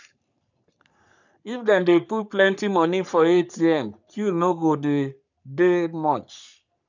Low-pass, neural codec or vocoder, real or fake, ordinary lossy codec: 7.2 kHz; codec, 44.1 kHz, 3.4 kbps, Pupu-Codec; fake; none